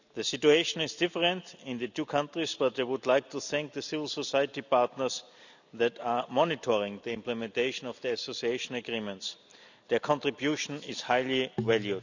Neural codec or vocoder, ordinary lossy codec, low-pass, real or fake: none; none; 7.2 kHz; real